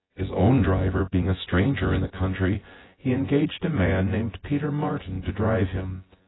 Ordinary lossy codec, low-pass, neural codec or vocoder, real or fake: AAC, 16 kbps; 7.2 kHz; vocoder, 24 kHz, 100 mel bands, Vocos; fake